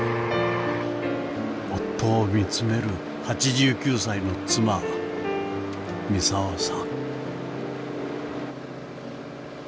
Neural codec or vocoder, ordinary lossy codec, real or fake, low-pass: none; none; real; none